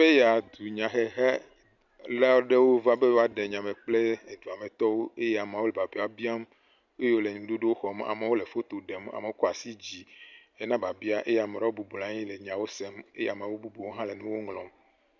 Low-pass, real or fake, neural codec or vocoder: 7.2 kHz; real; none